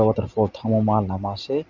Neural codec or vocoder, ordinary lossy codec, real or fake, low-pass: none; none; real; 7.2 kHz